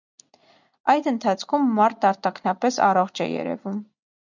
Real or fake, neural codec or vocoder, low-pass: real; none; 7.2 kHz